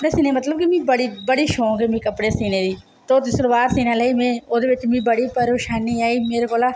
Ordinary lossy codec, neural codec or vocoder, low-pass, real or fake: none; none; none; real